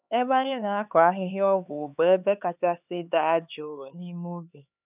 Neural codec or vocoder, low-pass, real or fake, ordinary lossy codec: codec, 16 kHz, 4 kbps, X-Codec, HuBERT features, trained on LibriSpeech; 3.6 kHz; fake; none